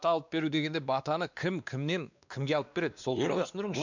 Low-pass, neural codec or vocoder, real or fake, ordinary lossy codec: 7.2 kHz; codec, 16 kHz, 2 kbps, X-Codec, WavLM features, trained on Multilingual LibriSpeech; fake; none